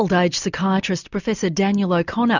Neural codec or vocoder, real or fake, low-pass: none; real; 7.2 kHz